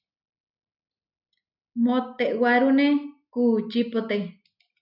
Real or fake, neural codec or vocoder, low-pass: real; none; 5.4 kHz